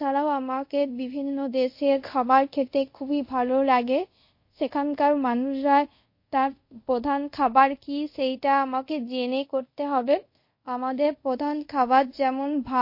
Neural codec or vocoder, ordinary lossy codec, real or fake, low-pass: codec, 24 kHz, 0.5 kbps, DualCodec; MP3, 32 kbps; fake; 5.4 kHz